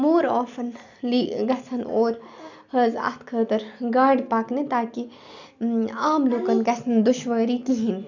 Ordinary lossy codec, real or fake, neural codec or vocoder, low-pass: none; real; none; 7.2 kHz